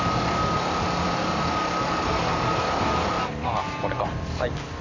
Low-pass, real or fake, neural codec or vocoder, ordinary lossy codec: 7.2 kHz; real; none; none